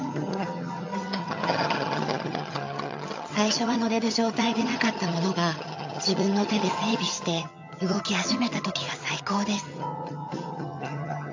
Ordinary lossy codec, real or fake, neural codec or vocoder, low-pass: AAC, 48 kbps; fake; vocoder, 22.05 kHz, 80 mel bands, HiFi-GAN; 7.2 kHz